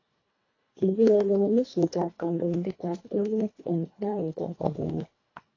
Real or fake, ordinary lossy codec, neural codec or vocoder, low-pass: fake; AAC, 32 kbps; codec, 24 kHz, 1.5 kbps, HILCodec; 7.2 kHz